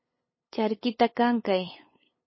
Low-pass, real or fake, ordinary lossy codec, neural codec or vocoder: 7.2 kHz; fake; MP3, 24 kbps; codec, 16 kHz, 8 kbps, FunCodec, trained on LibriTTS, 25 frames a second